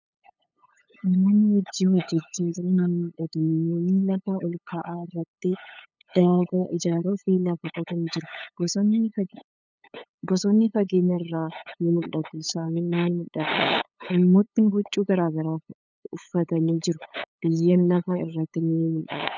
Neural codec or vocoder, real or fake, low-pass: codec, 16 kHz, 8 kbps, FunCodec, trained on LibriTTS, 25 frames a second; fake; 7.2 kHz